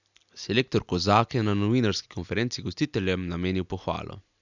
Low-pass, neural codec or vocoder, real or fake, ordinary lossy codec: 7.2 kHz; none; real; none